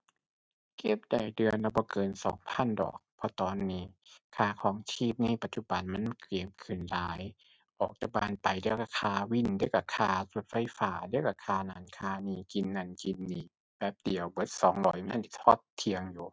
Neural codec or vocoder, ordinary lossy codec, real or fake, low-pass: none; none; real; none